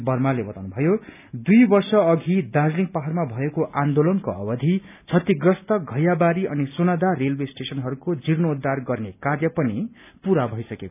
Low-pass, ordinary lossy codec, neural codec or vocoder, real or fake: 3.6 kHz; none; none; real